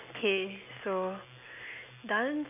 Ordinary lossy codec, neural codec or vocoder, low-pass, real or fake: none; none; 3.6 kHz; real